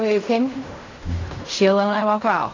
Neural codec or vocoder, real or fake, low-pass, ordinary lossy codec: codec, 16 kHz in and 24 kHz out, 0.4 kbps, LongCat-Audio-Codec, fine tuned four codebook decoder; fake; 7.2 kHz; AAC, 32 kbps